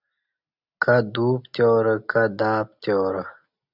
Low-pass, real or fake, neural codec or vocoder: 5.4 kHz; real; none